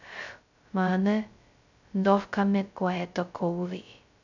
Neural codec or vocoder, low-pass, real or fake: codec, 16 kHz, 0.2 kbps, FocalCodec; 7.2 kHz; fake